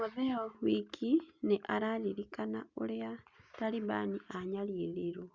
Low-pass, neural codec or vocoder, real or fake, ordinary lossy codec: 7.2 kHz; none; real; Opus, 64 kbps